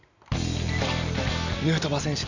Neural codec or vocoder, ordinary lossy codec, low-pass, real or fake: none; none; 7.2 kHz; real